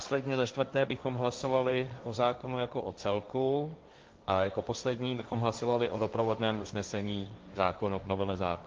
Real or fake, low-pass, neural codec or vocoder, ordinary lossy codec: fake; 7.2 kHz; codec, 16 kHz, 1.1 kbps, Voila-Tokenizer; Opus, 24 kbps